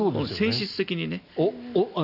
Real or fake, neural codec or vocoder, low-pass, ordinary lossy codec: real; none; 5.4 kHz; none